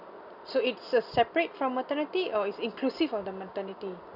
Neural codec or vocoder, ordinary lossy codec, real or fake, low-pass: none; none; real; 5.4 kHz